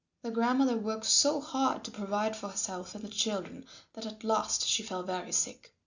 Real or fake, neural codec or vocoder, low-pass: real; none; 7.2 kHz